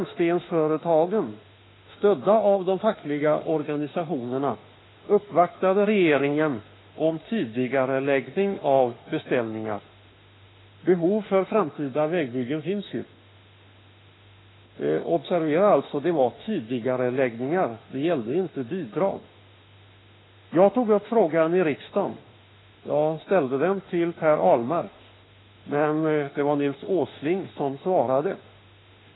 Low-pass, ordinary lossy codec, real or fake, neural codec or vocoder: 7.2 kHz; AAC, 16 kbps; fake; autoencoder, 48 kHz, 32 numbers a frame, DAC-VAE, trained on Japanese speech